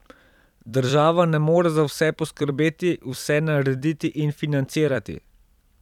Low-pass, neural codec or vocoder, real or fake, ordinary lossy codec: 19.8 kHz; vocoder, 44.1 kHz, 128 mel bands every 256 samples, BigVGAN v2; fake; none